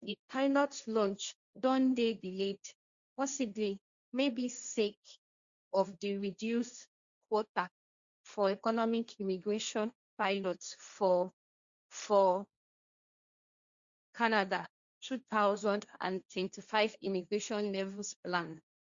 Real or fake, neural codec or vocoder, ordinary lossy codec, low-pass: fake; codec, 16 kHz, 1.1 kbps, Voila-Tokenizer; Opus, 64 kbps; 7.2 kHz